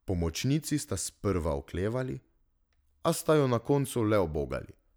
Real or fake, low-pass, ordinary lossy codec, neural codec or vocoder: real; none; none; none